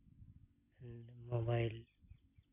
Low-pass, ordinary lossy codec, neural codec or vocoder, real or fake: 3.6 kHz; none; none; real